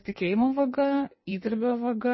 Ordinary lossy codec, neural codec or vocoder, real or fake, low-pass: MP3, 24 kbps; codec, 16 kHz in and 24 kHz out, 1.1 kbps, FireRedTTS-2 codec; fake; 7.2 kHz